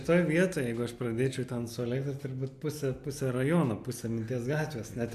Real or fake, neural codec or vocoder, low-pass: real; none; 14.4 kHz